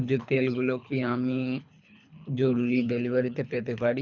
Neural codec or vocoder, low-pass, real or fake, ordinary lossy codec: codec, 24 kHz, 3 kbps, HILCodec; 7.2 kHz; fake; none